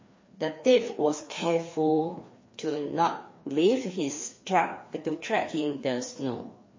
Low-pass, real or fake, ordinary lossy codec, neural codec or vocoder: 7.2 kHz; fake; MP3, 32 kbps; codec, 16 kHz, 2 kbps, FreqCodec, larger model